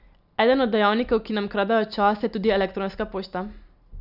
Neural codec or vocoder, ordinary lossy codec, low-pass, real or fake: none; none; 5.4 kHz; real